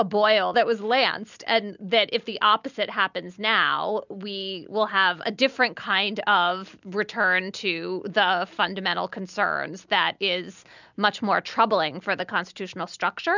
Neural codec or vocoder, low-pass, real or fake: none; 7.2 kHz; real